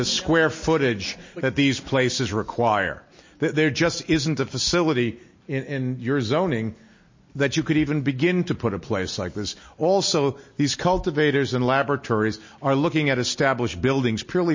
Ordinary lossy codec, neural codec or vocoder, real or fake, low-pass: MP3, 32 kbps; none; real; 7.2 kHz